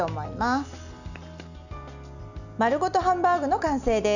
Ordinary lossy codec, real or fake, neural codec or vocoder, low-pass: none; real; none; 7.2 kHz